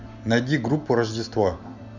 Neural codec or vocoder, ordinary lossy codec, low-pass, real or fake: none; none; 7.2 kHz; real